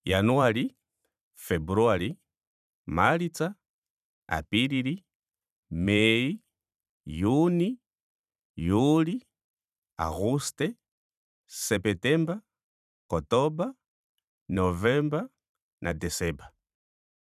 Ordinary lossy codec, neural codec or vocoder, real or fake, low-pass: none; vocoder, 44.1 kHz, 128 mel bands every 512 samples, BigVGAN v2; fake; 14.4 kHz